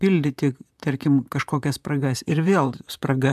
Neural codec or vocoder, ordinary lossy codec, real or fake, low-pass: vocoder, 44.1 kHz, 128 mel bands, Pupu-Vocoder; AAC, 96 kbps; fake; 14.4 kHz